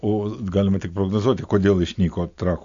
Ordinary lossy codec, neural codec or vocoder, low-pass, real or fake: AAC, 64 kbps; none; 7.2 kHz; real